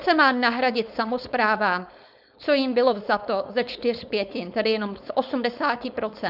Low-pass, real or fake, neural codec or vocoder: 5.4 kHz; fake; codec, 16 kHz, 4.8 kbps, FACodec